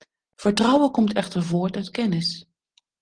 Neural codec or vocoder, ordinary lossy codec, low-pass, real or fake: none; Opus, 16 kbps; 9.9 kHz; real